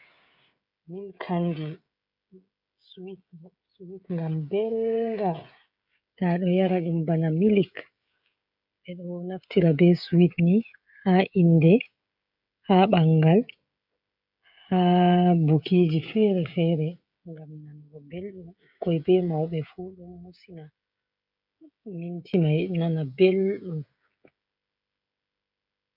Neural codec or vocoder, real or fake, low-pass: codec, 16 kHz, 16 kbps, FreqCodec, smaller model; fake; 5.4 kHz